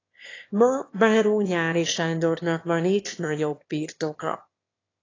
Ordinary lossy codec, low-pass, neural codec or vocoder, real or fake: AAC, 32 kbps; 7.2 kHz; autoencoder, 22.05 kHz, a latent of 192 numbers a frame, VITS, trained on one speaker; fake